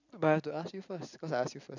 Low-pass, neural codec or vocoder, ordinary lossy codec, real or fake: 7.2 kHz; none; none; real